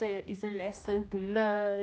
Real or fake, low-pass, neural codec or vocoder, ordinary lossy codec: fake; none; codec, 16 kHz, 1 kbps, X-Codec, HuBERT features, trained on general audio; none